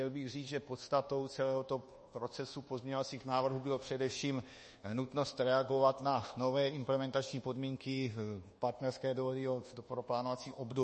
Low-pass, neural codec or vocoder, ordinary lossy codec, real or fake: 10.8 kHz; codec, 24 kHz, 1.2 kbps, DualCodec; MP3, 32 kbps; fake